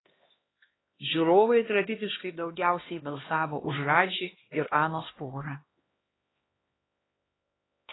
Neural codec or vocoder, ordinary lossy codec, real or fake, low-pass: codec, 16 kHz, 1 kbps, X-Codec, HuBERT features, trained on LibriSpeech; AAC, 16 kbps; fake; 7.2 kHz